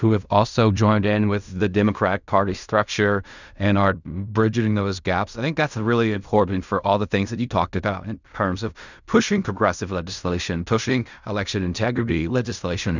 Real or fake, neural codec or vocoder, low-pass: fake; codec, 16 kHz in and 24 kHz out, 0.4 kbps, LongCat-Audio-Codec, fine tuned four codebook decoder; 7.2 kHz